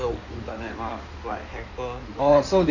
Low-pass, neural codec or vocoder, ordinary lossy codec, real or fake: 7.2 kHz; codec, 16 kHz in and 24 kHz out, 2.2 kbps, FireRedTTS-2 codec; none; fake